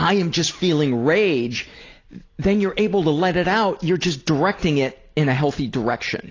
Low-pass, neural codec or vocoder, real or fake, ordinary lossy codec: 7.2 kHz; none; real; AAC, 32 kbps